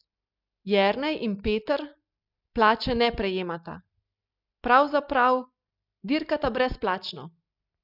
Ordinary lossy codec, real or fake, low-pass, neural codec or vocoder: none; real; 5.4 kHz; none